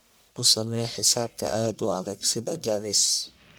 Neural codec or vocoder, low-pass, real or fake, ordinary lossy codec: codec, 44.1 kHz, 1.7 kbps, Pupu-Codec; none; fake; none